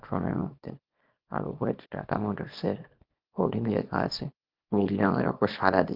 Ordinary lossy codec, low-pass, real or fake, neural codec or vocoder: Opus, 24 kbps; 5.4 kHz; fake; codec, 24 kHz, 0.9 kbps, WavTokenizer, small release